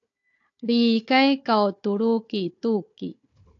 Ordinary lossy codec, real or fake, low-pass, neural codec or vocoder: AAC, 48 kbps; fake; 7.2 kHz; codec, 16 kHz, 4 kbps, FunCodec, trained on Chinese and English, 50 frames a second